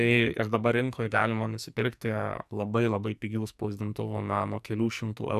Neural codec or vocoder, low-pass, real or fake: codec, 44.1 kHz, 2.6 kbps, SNAC; 14.4 kHz; fake